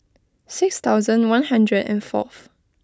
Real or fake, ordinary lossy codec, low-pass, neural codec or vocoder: real; none; none; none